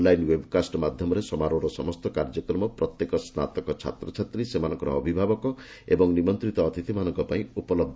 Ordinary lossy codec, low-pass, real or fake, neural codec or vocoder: none; none; real; none